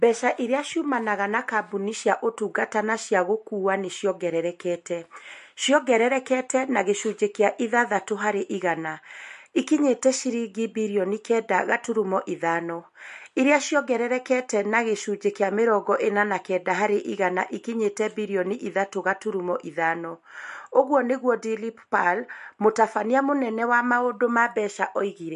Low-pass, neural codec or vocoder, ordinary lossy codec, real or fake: 14.4 kHz; none; MP3, 48 kbps; real